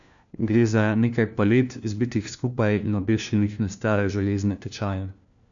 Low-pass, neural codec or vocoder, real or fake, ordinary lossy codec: 7.2 kHz; codec, 16 kHz, 1 kbps, FunCodec, trained on LibriTTS, 50 frames a second; fake; none